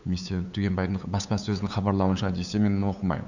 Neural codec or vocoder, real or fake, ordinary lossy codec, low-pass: codec, 16 kHz, 8 kbps, FunCodec, trained on LibriTTS, 25 frames a second; fake; none; 7.2 kHz